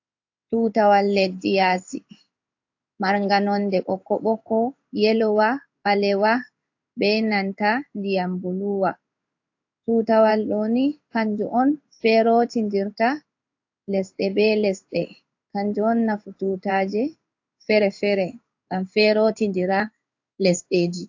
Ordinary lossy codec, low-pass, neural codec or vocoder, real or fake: AAC, 48 kbps; 7.2 kHz; codec, 16 kHz in and 24 kHz out, 1 kbps, XY-Tokenizer; fake